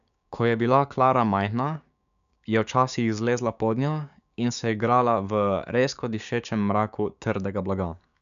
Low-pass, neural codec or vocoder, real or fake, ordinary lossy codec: 7.2 kHz; codec, 16 kHz, 6 kbps, DAC; fake; none